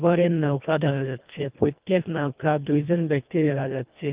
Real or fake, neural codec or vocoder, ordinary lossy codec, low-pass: fake; codec, 24 kHz, 1.5 kbps, HILCodec; Opus, 24 kbps; 3.6 kHz